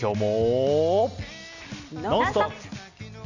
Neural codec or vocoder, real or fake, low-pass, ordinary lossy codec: none; real; 7.2 kHz; none